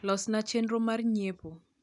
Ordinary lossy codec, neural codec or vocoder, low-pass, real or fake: none; none; 10.8 kHz; real